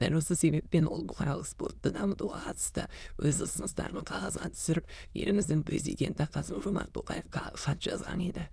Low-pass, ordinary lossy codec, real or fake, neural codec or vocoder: none; none; fake; autoencoder, 22.05 kHz, a latent of 192 numbers a frame, VITS, trained on many speakers